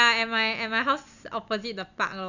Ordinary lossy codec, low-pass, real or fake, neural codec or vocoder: none; 7.2 kHz; real; none